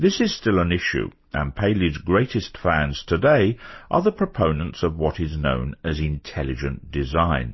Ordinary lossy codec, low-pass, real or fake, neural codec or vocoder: MP3, 24 kbps; 7.2 kHz; real; none